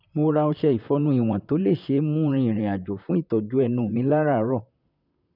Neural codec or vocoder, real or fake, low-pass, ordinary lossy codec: vocoder, 44.1 kHz, 128 mel bands, Pupu-Vocoder; fake; 5.4 kHz; none